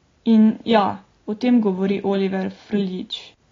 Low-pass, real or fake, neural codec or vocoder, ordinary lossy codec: 7.2 kHz; real; none; AAC, 32 kbps